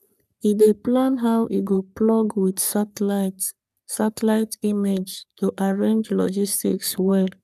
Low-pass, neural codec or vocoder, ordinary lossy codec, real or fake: 14.4 kHz; codec, 44.1 kHz, 3.4 kbps, Pupu-Codec; none; fake